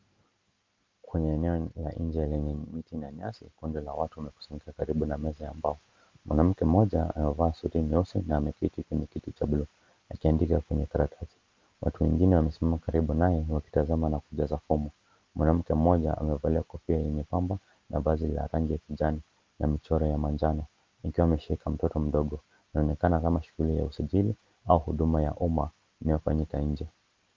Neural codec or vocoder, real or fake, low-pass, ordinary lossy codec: none; real; 7.2 kHz; Opus, 24 kbps